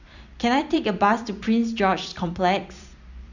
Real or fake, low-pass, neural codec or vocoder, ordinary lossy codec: fake; 7.2 kHz; vocoder, 44.1 kHz, 128 mel bands every 256 samples, BigVGAN v2; none